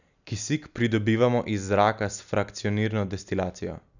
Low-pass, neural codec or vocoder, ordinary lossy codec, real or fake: 7.2 kHz; none; none; real